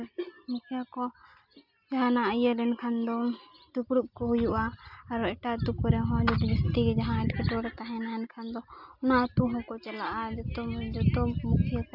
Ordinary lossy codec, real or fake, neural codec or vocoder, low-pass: none; real; none; 5.4 kHz